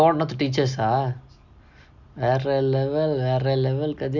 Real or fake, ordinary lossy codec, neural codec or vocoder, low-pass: real; none; none; 7.2 kHz